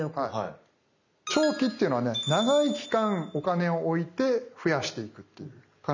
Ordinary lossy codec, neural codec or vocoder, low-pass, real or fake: none; none; 7.2 kHz; real